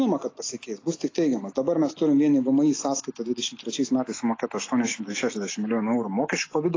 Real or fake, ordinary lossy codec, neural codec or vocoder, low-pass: real; AAC, 32 kbps; none; 7.2 kHz